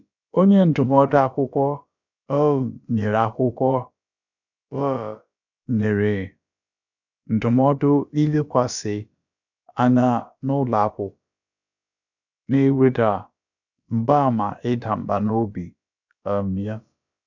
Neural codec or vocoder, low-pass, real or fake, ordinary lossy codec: codec, 16 kHz, about 1 kbps, DyCAST, with the encoder's durations; 7.2 kHz; fake; none